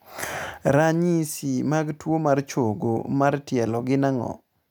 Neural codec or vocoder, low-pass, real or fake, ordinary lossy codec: none; none; real; none